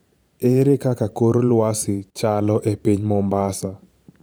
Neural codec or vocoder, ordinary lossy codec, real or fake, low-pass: vocoder, 44.1 kHz, 128 mel bands every 512 samples, BigVGAN v2; none; fake; none